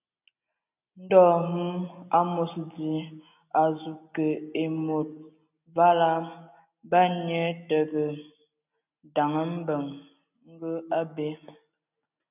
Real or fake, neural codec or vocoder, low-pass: real; none; 3.6 kHz